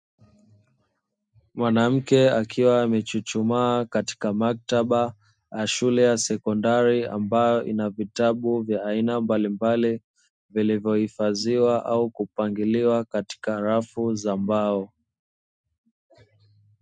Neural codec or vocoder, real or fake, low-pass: none; real; 9.9 kHz